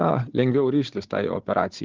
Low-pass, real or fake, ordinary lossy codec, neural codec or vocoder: 7.2 kHz; fake; Opus, 16 kbps; codec, 16 kHz, 16 kbps, FunCodec, trained on Chinese and English, 50 frames a second